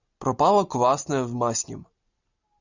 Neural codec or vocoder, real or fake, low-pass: none; real; 7.2 kHz